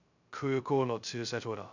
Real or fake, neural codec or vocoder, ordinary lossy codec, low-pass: fake; codec, 16 kHz, 0.2 kbps, FocalCodec; none; 7.2 kHz